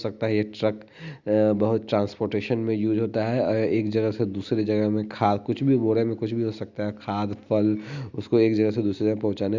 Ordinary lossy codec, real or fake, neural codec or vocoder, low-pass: Opus, 64 kbps; real; none; 7.2 kHz